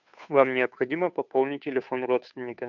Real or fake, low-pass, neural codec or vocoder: fake; 7.2 kHz; codec, 16 kHz, 2 kbps, FunCodec, trained on Chinese and English, 25 frames a second